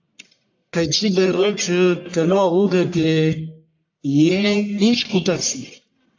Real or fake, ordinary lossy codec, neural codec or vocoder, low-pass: fake; AAC, 48 kbps; codec, 44.1 kHz, 1.7 kbps, Pupu-Codec; 7.2 kHz